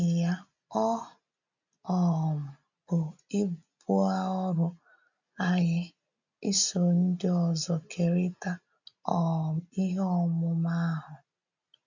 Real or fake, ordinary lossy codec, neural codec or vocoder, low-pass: real; none; none; 7.2 kHz